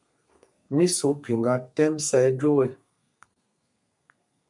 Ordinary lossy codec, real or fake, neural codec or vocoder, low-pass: MP3, 64 kbps; fake; codec, 32 kHz, 1.9 kbps, SNAC; 10.8 kHz